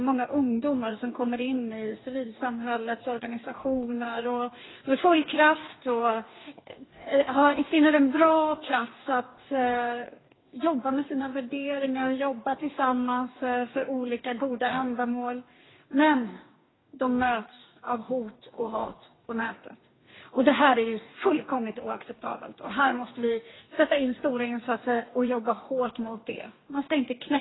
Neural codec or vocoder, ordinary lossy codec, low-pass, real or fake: codec, 44.1 kHz, 2.6 kbps, DAC; AAC, 16 kbps; 7.2 kHz; fake